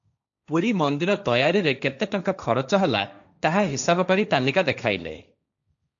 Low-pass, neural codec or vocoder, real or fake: 7.2 kHz; codec, 16 kHz, 1.1 kbps, Voila-Tokenizer; fake